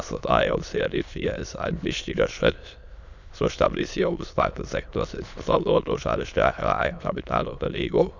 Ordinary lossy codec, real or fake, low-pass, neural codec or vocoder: none; fake; 7.2 kHz; autoencoder, 22.05 kHz, a latent of 192 numbers a frame, VITS, trained on many speakers